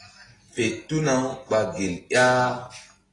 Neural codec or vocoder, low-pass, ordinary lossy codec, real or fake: none; 10.8 kHz; AAC, 32 kbps; real